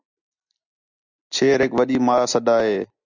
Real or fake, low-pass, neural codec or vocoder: real; 7.2 kHz; none